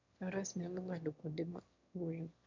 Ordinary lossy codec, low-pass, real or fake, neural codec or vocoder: MP3, 48 kbps; 7.2 kHz; fake; autoencoder, 22.05 kHz, a latent of 192 numbers a frame, VITS, trained on one speaker